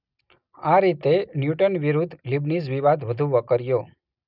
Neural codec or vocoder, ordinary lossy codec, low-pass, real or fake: none; none; 5.4 kHz; real